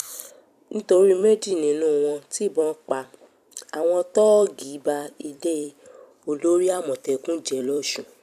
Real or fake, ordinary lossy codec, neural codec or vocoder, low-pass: fake; none; vocoder, 44.1 kHz, 128 mel bands every 256 samples, BigVGAN v2; 14.4 kHz